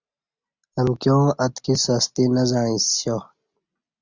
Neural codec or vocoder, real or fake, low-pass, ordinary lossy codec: none; real; 7.2 kHz; Opus, 64 kbps